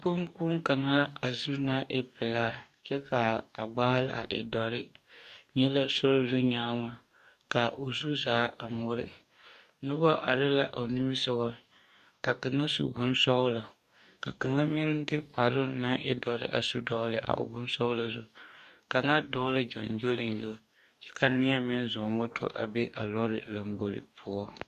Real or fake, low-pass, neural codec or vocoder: fake; 14.4 kHz; codec, 44.1 kHz, 2.6 kbps, DAC